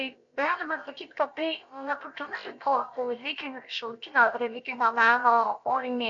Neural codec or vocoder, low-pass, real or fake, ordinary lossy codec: codec, 16 kHz, about 1 kbps, DyCAST, with the encoder's durations; 7.2 kHz; fake; MP3, 48 kbps